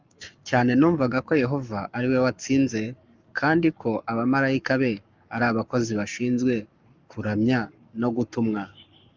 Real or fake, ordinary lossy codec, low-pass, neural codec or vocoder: fake; Opus, 24 kbps; 7.2 kHz; codec, 44.1 kHz, 7.8 kbps, Pupu-Codec